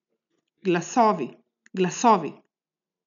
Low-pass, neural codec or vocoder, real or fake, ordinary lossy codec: 7.2 kHz; none; real; none